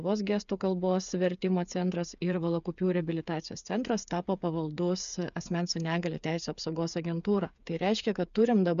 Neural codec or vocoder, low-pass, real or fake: codec, 16 kHz, 8 kbps, FreqCodec, smaller model; 7.2 kHz; fake